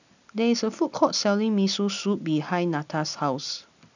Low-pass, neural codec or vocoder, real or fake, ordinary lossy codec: 7.2 kHz; none; real; none